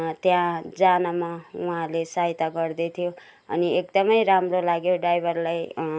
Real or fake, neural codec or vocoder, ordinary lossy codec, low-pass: real; none; none; none